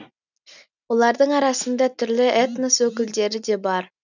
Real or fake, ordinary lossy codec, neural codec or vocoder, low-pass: real; none; none; 7.2 kHz